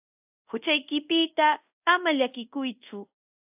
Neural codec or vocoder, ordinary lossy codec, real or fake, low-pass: codec, 24 kHz, 0.5 kbps, DualCodec; AAC, 32 kbps; fake; 3.6 kHz